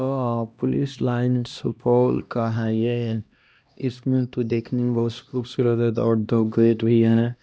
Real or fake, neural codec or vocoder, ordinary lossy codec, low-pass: fake; codec, 16 kHz, 1 kbps, X-Codec, HuBERT features, trained on LibriSpeech; none; none